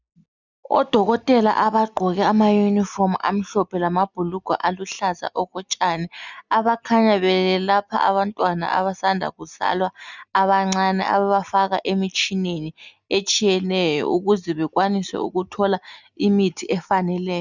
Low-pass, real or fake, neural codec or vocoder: 7.2 kHz; real; none